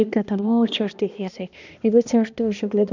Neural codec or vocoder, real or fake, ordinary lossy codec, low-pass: codec, 16 kHz, 1 kbps, X-Codec, HuBERT features, trained on balanced general audio; fake; none; 7.2 kHz